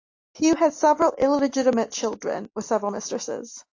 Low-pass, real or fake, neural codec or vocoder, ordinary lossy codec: 7.2 kHz; real; none; AAC, 48 kbps